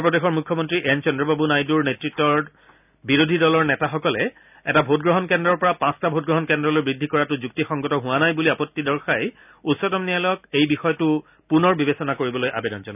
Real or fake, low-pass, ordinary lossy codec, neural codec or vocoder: real; 3.6 kHz; none; none